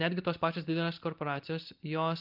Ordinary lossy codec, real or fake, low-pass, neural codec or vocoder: Opus, 24 kbps; real; 5.4 kHz; none